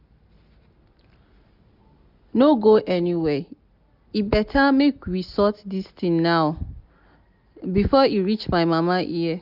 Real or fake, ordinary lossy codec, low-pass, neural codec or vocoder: real; none; 5.4 kHz; none